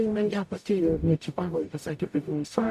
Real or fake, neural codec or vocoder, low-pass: fake; codec, 44.1 kHz, 0.9 kbps, DAC; 14.4 kHz